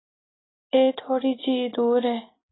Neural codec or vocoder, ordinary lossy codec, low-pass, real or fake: none; AAC, 16 kbps; 7.2 kHz; real